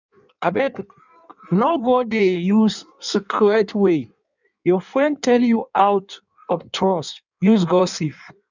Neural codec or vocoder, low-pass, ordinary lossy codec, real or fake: codec, 16 kHz in and 24 kHz out, 1.1 kbps, FireRedTTS-2 codec; 7.2 kHz; none; fake